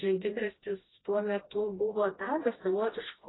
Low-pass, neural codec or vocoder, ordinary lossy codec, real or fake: 7.2 kHz; codec, 16 kHz, 1 kbps, FreqCodec, smaller model; AAC, 16 kbps; fake